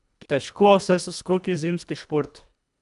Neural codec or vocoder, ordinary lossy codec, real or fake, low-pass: codec, 24 kHz, 1.5 kbps, HILCodec; none; fake; 10.8 kHz